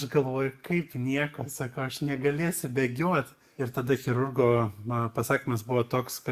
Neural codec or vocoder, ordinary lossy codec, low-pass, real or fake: codec, 44.1 kHz, 7.8 kbps, DAC; Opus, 64 kbps; 14.4 kHz; fake